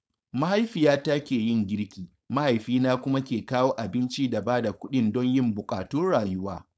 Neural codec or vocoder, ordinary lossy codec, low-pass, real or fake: codec, 16 kHz, 4.8 kbps, FACodec; none; none; fake